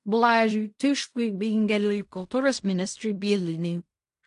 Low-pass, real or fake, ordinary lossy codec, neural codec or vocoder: 10.8 kHz; fake; AAC, 64 kbps; codec, 16 kHz in and 24 kHz out, 0.4 kbps, LongCat-Audio-Codec, fine tuned four codebook decoder